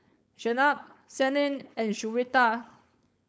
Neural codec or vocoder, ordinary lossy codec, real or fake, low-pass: codec, 16 kHz, 4.8 kbps, FACodec; none; fake; none